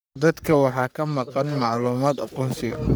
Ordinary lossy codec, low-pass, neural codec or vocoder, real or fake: none; none; codec, 44.1 kHz, 3.4 kbps, Pupu-Codec; fake